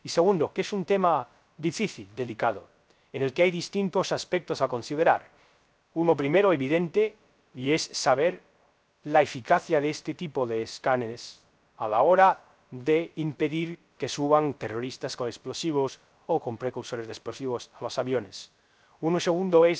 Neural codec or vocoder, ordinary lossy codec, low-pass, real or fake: codec, 16 kHz, 0.3 kbps, FocalCodec; none; none; fake